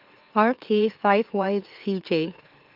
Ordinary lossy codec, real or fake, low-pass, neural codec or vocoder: Opus, 32 kbps; fake; 5.4 kHz; autoencoder, 44.1 kHz, a latent of 192 numbers a frame, MeloTTS